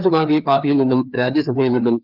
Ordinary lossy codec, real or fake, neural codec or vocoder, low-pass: Opus, 24 kbps; fake; codec, 16 kHz, 2 kbps, FreqCodec, larger model; 5.4 kHz